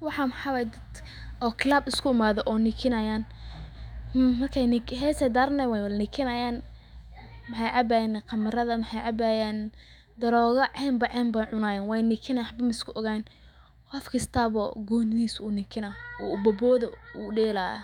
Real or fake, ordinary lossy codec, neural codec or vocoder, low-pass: fake; none; autoencoder, 48 kHz, 128 numbers a frame, DAC-VAE, trained on Japanese speech; 19.8 kHz